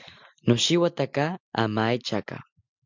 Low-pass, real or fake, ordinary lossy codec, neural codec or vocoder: 7.2 kHz; real; MP3, 64 kbps; none